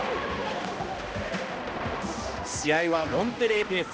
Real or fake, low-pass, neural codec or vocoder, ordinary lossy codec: fake; none; codec, 16 kHz, 1 kbps, X-Codec, HuBERT features, trained on balanced general audio; none